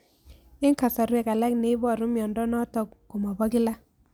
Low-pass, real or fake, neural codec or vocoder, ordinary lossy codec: none; real; none; none